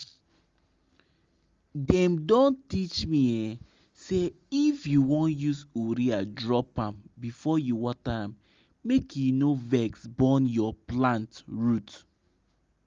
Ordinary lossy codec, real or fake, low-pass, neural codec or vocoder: Opus, 32 kbps; real; 7.2 kHz; none